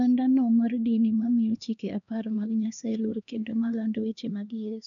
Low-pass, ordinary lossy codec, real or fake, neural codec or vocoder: 7.2 kHz; MP3, 96 kbps; fake; codec, 16 kHz, 2 kbps, X-Codec, HuBERT features, trained on LibriSpeech